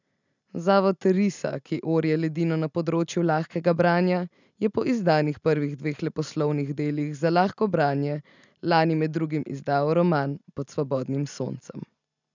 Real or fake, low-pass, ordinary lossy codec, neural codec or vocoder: real; 7.2 kHz; none; none